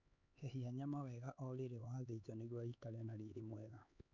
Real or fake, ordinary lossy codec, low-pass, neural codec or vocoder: fake; none; 7.2 kHz; codec, 16 kHz, 4 kbps, X-Codec, HuBERT features, trained on LibriSpeech